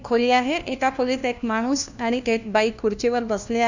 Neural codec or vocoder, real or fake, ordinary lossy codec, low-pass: codec, 16 kHz, 1 kbps, FunCodec, trained on LibriTTS, 50 frames a second; fake; none; 7.2 kHz